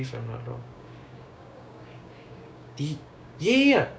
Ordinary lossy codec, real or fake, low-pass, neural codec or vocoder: none; fake; none; codec, 16 kHz, 6 kbps, DAC